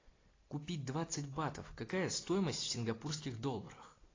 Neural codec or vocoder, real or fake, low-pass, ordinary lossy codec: none; real; 7.2 kHz; AAC, 32 kbps